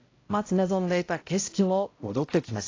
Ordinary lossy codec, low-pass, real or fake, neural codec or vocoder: AAC, 32 kbps; 7.2 kHz; fake; codec, 16 kHz, 0.5 kbps, X-Codec, HuBERT features, trained on balanced general audio